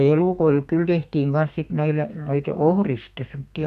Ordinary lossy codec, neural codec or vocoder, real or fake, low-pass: none; codec, 32 kHz, 1.9 kbps, SNAC; fake; 14.4 kHz